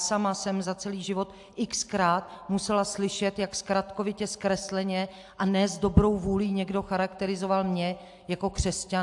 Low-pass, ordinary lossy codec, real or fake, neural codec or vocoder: 10.8 kHz; AAC, 64 kbps; real; none